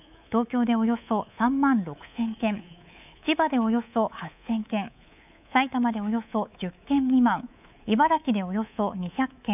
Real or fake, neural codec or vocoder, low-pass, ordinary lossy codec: fake; codec, 24 kHz, 3.1 kbps, DualCodec; 3.6 kHz; none